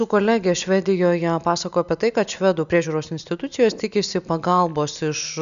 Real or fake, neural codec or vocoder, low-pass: real; none; 7.2 kHz